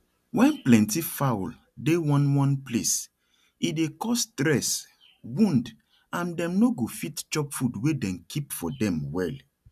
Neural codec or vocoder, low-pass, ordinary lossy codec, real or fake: none; 14.4 kHz; none; real